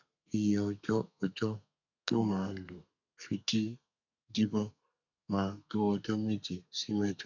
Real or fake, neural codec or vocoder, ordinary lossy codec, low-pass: fake; codec, 32 kHz, 1.9 kbps, SNAC; none; 7.2 kHz